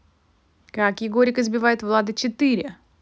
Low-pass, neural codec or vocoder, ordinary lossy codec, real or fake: none; none; none; real